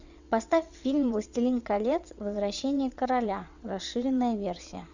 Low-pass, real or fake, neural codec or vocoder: 7.2 kHz; fake; vocoder, 22.05 kHz, 80 mel bands, WaveNeXt